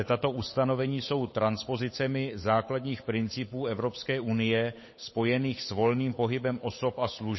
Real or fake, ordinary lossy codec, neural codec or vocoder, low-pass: fake; MP3, 24 kbps; codec, 16 kHz, 8 kbps, FunCodec, trained on Chinese and English, 25 frames a second; 7.2 kHz